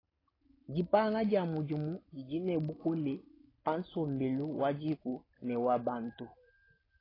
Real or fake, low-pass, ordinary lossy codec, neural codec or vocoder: real; 5.4 kHz; AAC, 24 kbps; none